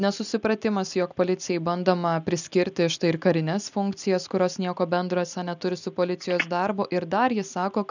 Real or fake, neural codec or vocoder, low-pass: real; none; 7.2 kHz